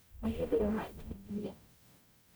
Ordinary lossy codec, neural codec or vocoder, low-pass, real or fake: none; codec, 44.1 kHz, 0.9 kbps, DAC; none; fake